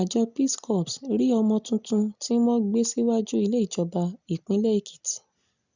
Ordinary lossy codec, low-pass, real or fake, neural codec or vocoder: none; 7.2 kHz; real; none